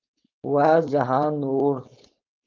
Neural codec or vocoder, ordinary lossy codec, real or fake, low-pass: codec, 16 kHz, 4.8 kbps, FACodec; Opus, 24 kbps; fake; 7.2 kHz